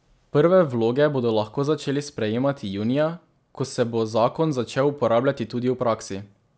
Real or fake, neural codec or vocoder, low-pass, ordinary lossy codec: real; none; none; none